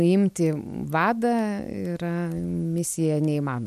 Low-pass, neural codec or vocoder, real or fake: 14.4 kHz; none; real